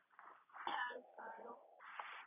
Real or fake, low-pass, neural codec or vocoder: fake; 3.6 kHz; vocoder, 44.1 kHz, 80 mel bands, Vocos